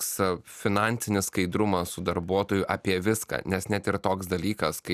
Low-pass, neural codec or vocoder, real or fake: 14.4 kHz; none; real